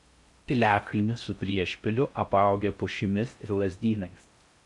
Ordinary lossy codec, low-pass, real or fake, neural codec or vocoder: MP3, 64 kbps; 10.8 kHz; fake; codec, 16 kHz in and 24 kHz out, 0.6 kbps, FocalCodec, streaming, 4096 codes